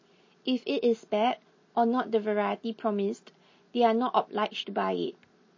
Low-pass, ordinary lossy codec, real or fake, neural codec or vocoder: 7.2 kHz; MP3, 32 kbps; real; none